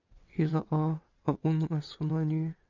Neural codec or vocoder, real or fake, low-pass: vocoder, 22.05 kHz, 80 mel bands, WaveNeXt; fake; 7.2 kHz